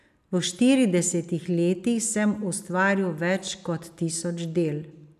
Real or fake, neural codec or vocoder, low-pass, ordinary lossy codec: real; none; 14.4 kHz; none